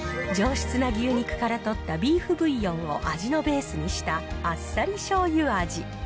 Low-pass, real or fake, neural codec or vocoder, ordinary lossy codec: none; real; none; none